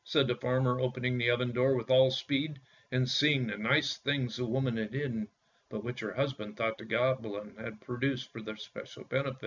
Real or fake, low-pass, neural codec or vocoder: real; 7.2 kHz; none